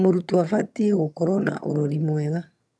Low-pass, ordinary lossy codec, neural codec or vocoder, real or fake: none; none; vocoder, 22.05 kHz, 80 mel bands, HiFi-GAN; fake